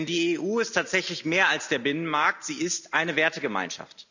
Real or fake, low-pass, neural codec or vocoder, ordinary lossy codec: real; 7.2 kHz; none; none